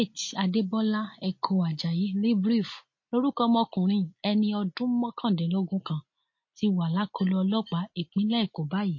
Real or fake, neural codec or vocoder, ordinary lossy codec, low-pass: real; none; MP3, 32 kbps; 7.2 kHz